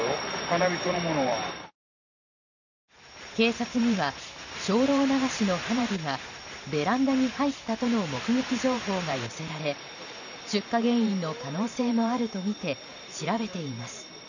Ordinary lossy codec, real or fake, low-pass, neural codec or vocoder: none; fake; 7.2 kHz; vocoder, 44.1 kHz, 128 mel bands every 512 samples, BigVGAN v2